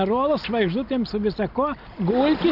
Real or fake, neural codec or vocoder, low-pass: real; none; 5.4 kHz